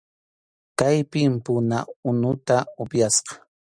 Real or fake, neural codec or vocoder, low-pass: real; none; 9.9 kHz